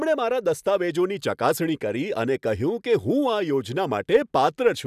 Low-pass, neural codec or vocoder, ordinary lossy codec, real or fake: 14.4 kHz; none; none; real